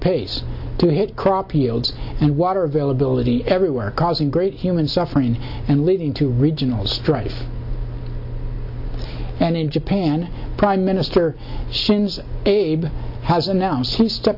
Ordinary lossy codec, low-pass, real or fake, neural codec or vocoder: AAC, 48 kbps; 5.4 kHz; real; none